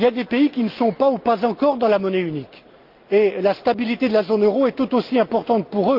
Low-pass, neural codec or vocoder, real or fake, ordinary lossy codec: 5.4 kHz; none; real; Opus, 24 kbps